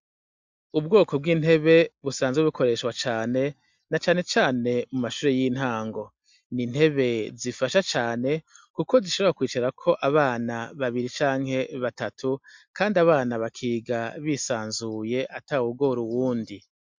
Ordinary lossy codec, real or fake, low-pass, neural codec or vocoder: MP3, 64 kbps; real; 7.2 kHz; none